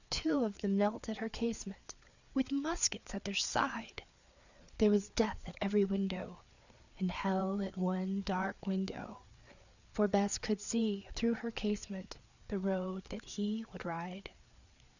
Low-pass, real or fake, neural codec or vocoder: 7.2 kHz; fake; codec, 16 kHz, 4 kbps, FreqCodec, larger model